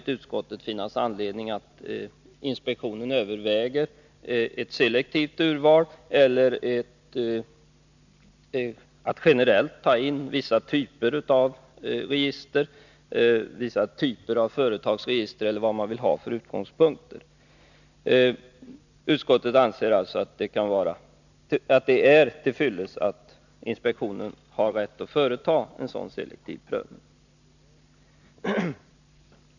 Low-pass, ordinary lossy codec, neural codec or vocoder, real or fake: 7.2 kHz; none; none; real